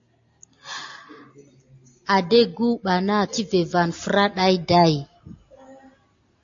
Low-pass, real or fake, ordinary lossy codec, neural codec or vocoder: 7.2 kHz; real; AAC, 48 kbps; none